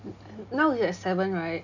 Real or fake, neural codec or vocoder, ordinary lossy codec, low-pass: real; none; none; 7.2 kHz